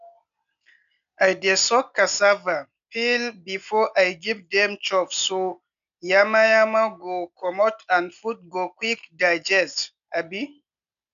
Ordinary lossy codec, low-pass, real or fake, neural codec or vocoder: none; 7.2 kHz; real; none